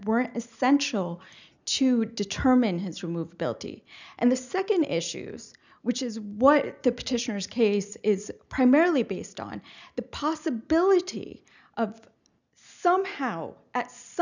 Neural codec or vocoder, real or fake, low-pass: none; real; 7.2 kHz